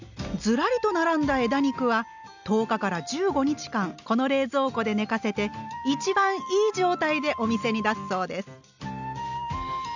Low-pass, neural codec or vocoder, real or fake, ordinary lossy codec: 7.2 kHz; none; real; none